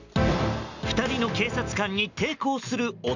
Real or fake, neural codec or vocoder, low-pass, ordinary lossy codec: real; none; 7.2 kHz; none